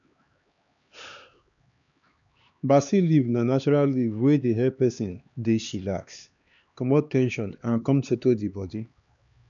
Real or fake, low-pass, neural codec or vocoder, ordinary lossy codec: fake; 7.2 kHz; codec, 16 kHz, 2 kbps, X-Codec, HuBERT features, trained on LibriSpeech; none